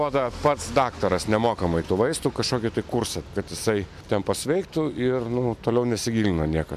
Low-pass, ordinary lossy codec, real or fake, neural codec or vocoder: 14.4 kHz; MP3, 96 kbps; real; none